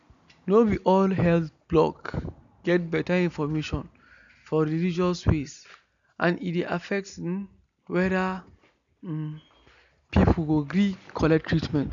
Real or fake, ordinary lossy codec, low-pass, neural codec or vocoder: real; none; 7.2 kHz; none